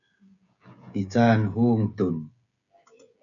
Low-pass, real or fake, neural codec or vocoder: 7.2 kHz; fake; codec, 16 kHz, 16 kbps, FreqCodec, smaller model